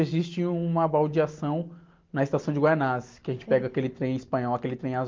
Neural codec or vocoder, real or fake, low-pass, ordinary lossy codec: none; real; 7.2 kHz; Opus, 32 kbps